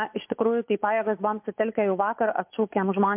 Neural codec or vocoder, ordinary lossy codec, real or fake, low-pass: vocoder, 24 kHz, 100 mel bands, Vocos; MP3, 32 kbps; fake; 3.6 kHz